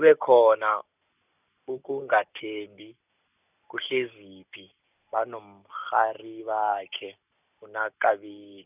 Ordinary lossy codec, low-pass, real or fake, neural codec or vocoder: none; 3.6 kHz; real; none